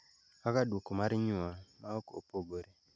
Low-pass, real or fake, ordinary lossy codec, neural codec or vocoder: none; real; none; none